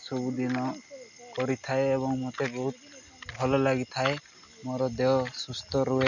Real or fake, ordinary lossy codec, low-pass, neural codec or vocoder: real; none; 7.2 kHz; none